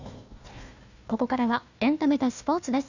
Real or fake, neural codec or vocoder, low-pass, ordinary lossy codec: fake; codec, 16 kHz, 1 kbps, FunCodec, trained on Chinese and English, 50 frames a second; 7.2 kHz; none